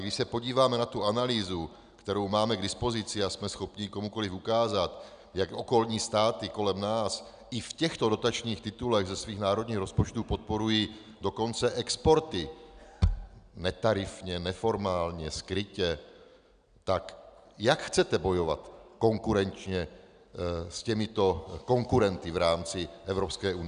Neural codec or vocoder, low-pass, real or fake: none; 9.9 kHz; real